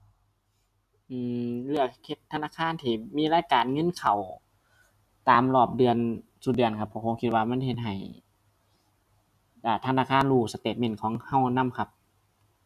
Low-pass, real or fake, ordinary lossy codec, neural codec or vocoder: 14.4 kHz; real; none; none